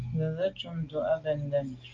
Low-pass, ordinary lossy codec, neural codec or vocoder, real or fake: 7.2 kHz; Opus, 24 kbps; none; real